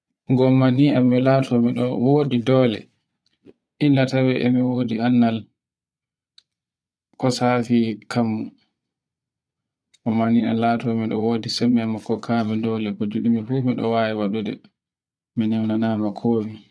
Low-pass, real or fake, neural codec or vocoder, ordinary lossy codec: none; fake; vocoder, 22.05 kHz, 80 mel bands, Vocos; none